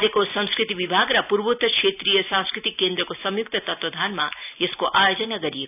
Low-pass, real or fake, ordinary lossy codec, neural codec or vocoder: 3.6 kHz; real; none; none